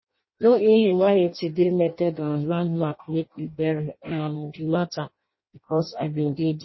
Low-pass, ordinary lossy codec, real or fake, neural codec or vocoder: 7.2 kHz; MP3, 24 kbps; fake; codec, 16 kHz in and 24 kHz out, 0.6 kbps, FireRedTTS-2 codec